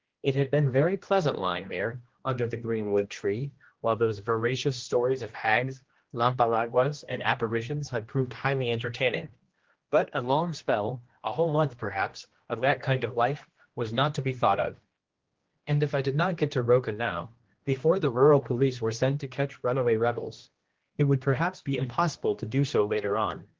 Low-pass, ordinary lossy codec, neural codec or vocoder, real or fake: 7.2 kHz; Opus, 16 kbps; codec, 16 kHz, 1 kbps, X-Codec, HuBERT features, trained on general audio; fake